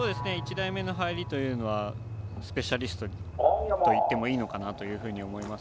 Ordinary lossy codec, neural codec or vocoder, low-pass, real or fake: none; none; none; real